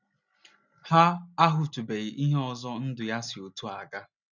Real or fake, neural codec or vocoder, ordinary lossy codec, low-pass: real; none; none; 7.2 kHz